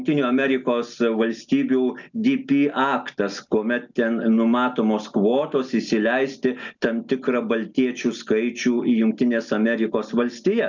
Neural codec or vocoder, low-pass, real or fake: none; 7.2 kHz; real